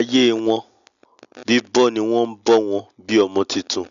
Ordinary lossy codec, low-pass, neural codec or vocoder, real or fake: none; 7.2 kHz; none; real